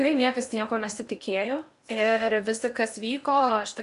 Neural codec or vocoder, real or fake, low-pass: codec, 16 kHz in and 24 kHz out, 0.8 kbps, FocalCodec, streaming, 65536 codes; fake; 10.8 kHz